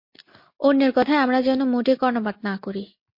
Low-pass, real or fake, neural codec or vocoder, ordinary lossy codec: 5.4 kHz; real; none; MP3, 32 kbps